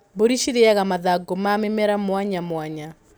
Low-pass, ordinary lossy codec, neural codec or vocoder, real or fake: none; none; none; real